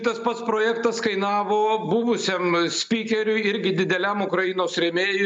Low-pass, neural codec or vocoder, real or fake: 9.9 kHz; none; real